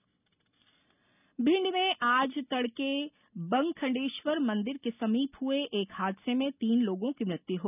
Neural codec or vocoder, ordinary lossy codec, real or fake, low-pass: none; none; real; 3.6 kHz